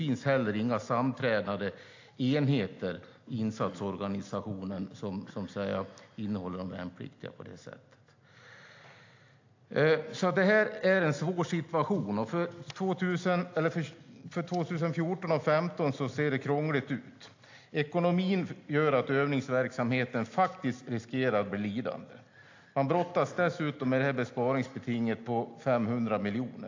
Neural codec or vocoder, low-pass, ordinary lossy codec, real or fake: none; 7.2 kHz; AAC, 48 kbps; real